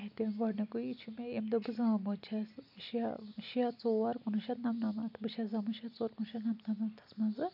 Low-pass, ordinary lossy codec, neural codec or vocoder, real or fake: 5.4 kHz; none; none; real